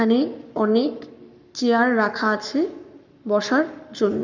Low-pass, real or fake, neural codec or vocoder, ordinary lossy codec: 7.2 kHz; fake; codec, 44.1 kHz, 7.8 kbps, Pupu-Codec; none